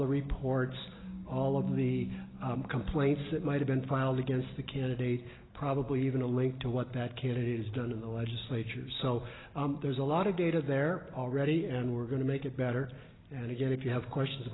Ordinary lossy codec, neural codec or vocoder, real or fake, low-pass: AAC, 16 kbps; none; real; 7.2 kHz